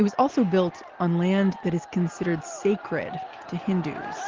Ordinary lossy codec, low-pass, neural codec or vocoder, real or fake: Opus, 16 kbps; 7.2 kHz; none; real